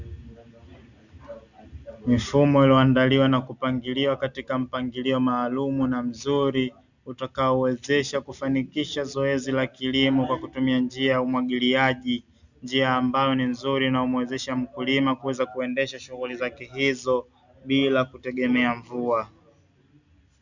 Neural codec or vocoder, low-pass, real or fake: none; 7.2 kHz; real